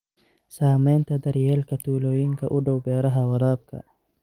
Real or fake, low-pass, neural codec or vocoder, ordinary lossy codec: real; 19.8 kHz; none; Opus, 24 kbps